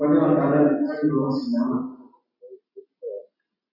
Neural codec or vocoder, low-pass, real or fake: none; 5.4 kHz; real